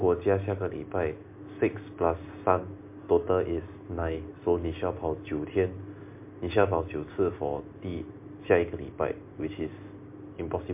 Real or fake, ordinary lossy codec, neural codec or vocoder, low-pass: real; MP3, 24 kbps; none; 3.6 kHz